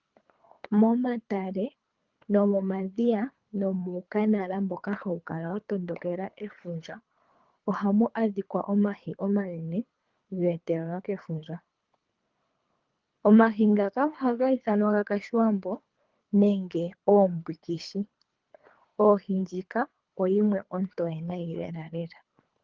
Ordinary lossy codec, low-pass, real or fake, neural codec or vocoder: Opus, 32 kbps; 7.2 kHz; fake; codec, 24 kHz, 3 kbps, HILCodec